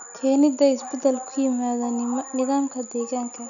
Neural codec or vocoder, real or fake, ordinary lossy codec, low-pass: none; real; none; 7.2 kHz